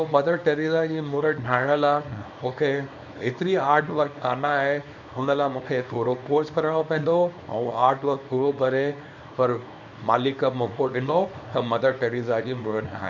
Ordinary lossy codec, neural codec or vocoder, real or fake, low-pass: none; codec, 24 kHz, 0.9 kbps, WavTokenizer, small release; fake; 7.2 kHz